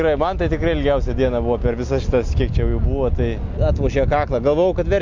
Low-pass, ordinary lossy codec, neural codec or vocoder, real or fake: 7.2 kHz; MP3, 64 kbps; none; real